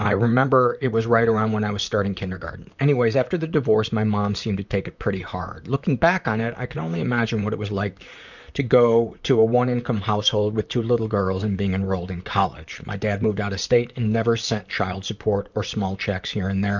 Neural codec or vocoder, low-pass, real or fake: vocoder, 44.1 kHz, 128 mel bands, Pupu-Vocoder; 7.2 kHz; fake